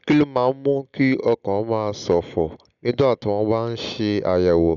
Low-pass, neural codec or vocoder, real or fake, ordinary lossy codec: 7.2 kHz; none; real; none